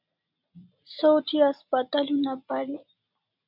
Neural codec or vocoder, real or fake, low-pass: none; real; 5.4 kHz